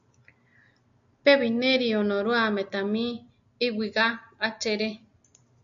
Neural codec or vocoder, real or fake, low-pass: none; real; 7.2 kHz